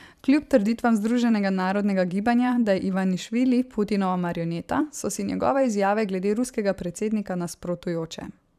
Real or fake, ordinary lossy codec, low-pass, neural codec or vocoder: fake; none; 14.4 kHz; vocoder, 44.1 kHz, 128 mel bands every 512 samples, BigVGAN v2